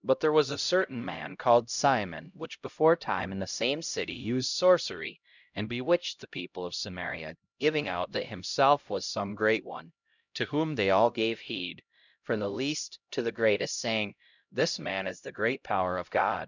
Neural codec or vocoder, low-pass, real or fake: codec, 16 kHz, 0.5 kbps, X-Codec, HuBERT features, trained on LibriSpeech; 7.2 kHz; fake